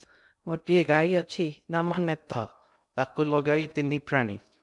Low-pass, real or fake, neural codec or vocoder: 10.8 kHz; fake; codec, 16 kHz in and 24 kHz out, 0.6 kbps, FocalCodec, streaming, 2048 codes